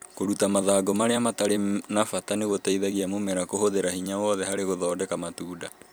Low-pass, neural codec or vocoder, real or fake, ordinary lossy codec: none; vocoder, 44.1 kHz, 128 mel bands every 256 samples, BigVGAN v2; fake; none